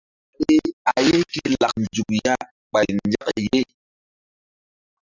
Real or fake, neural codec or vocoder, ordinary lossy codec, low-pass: real; none; Opus, 64 kbps; 7.2 kHz